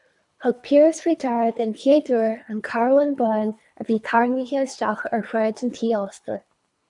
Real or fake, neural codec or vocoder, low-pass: fake; codec, 24 kHz, 3 kbps, HILCodec; 10.8 kHz